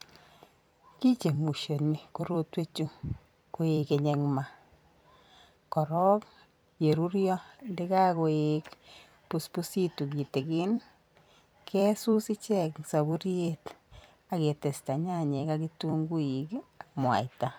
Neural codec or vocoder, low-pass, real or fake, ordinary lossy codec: vocoder, 44.1 kHz, 128 mel bands every 256 samples, BigVGAN v2; none; fake; none